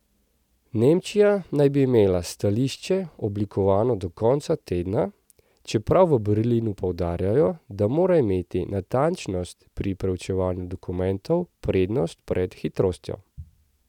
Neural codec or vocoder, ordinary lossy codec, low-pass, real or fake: none; none; 19.8 kHz; real